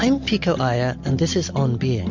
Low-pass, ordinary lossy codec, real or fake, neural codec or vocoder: 7.2 kHz; MP3, 64 kbps; real; none